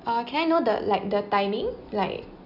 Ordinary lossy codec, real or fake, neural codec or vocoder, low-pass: none; real; none; 5.4 kHz